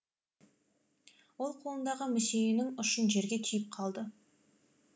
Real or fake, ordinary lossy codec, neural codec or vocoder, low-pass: real; none; none; none